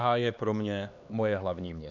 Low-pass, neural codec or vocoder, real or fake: 7.2 kHz; codec, 16 kHz, 2 kbps, X-Codec, HuBERT features, trained on LibriSpeech; fake